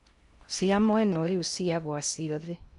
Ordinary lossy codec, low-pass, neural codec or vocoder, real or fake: none; 10.8 kHz; codec, 16 kHz in and 24 kHz out, 0.6 kbps, FocalCodec, streaming, 4096 codes; fake